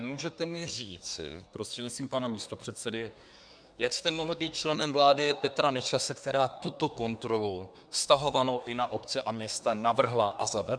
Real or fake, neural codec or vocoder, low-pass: fake; codec, 24 kHz, 1 kbps, SNAC; 9.9 kHz